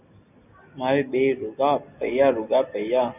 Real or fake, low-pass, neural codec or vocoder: real; 3.6 kHz; none